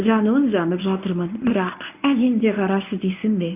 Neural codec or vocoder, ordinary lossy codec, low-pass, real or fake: codec, 24 kHz, 0.9 kbps, WavTokenizer, medium speech release version 1; none; 3.6 kHz; fake